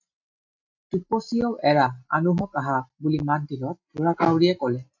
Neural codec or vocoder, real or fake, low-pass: none; real; 7.2 kHz